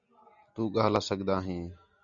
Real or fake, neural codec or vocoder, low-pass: real; none; 7.2 kHz